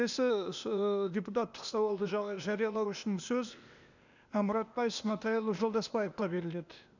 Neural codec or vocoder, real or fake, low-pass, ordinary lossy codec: codec, 16 kHz, 0.8 kbps, ZipCodec; fake; 7.2 kHz; none